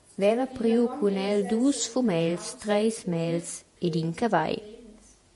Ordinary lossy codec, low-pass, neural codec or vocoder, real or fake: MP3, 48 kbps; 14.4 kHz; vocoder, 48 kHz, 128 mel bands, Vocos; fake